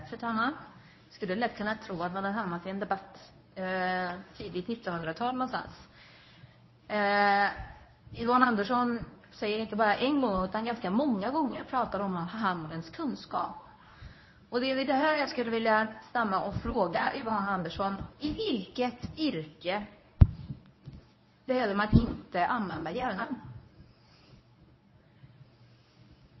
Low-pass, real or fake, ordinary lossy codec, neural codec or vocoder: 7.2 kHz; fake; MP3, 24 kbps; codec, 24 kHz, 0.9 kbps, WavTokenizer, medium speech release version 1